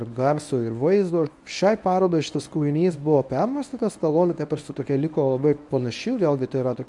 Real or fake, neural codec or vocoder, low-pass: fake; codec, 24 kHz, 0.9 kbps, WavTokenizer, medium speech release version 1; 10.8 kHz